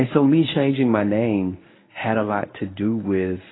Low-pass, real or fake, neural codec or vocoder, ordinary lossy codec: 7.2 kHz; fake; codec, 24 kHz, 0.9 kbps, WavTokenizer, medium speech release version 1; AAC, 16 kbps